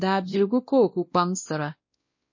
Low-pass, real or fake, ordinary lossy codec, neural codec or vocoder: 7.2 kHz; fake; MP3, 32 kbps; codec, 16 kHz, 1 kbps, X-Codec, WavLM features, trained on Multilingual LibriSpeech